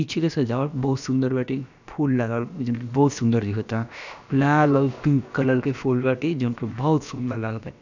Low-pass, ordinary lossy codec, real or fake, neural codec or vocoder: 7.2 kHz; none; fake; codec, 16 kHz, about 1 kbps, DyCAST, with the encoder's durations